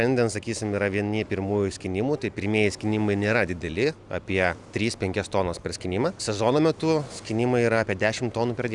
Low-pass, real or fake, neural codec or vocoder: 10.8 kHz; real; none